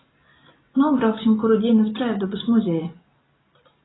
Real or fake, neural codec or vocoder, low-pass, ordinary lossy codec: real; none; 7.2 kHz; AAC, 16 kbps